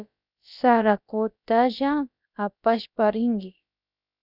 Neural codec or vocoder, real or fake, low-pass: codec, 16 kHz, about 1 kbps, DyCAST, with the encoder's durations; fake; 5.4 kHz